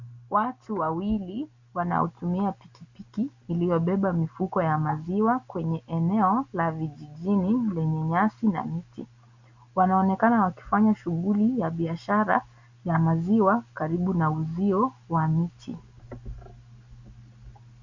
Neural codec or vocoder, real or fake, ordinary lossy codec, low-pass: none; real; Opus, 64 kbps; 7.2 kHz